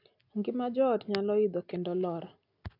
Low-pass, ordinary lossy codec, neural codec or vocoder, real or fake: 5.4 kHz; none; none; real